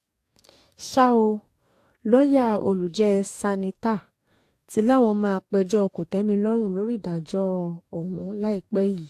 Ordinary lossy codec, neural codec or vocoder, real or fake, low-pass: AAC, 64 kbps; codec, 44.1 kHz, 2.6 kbps, DAC; fake; 14.4 kHz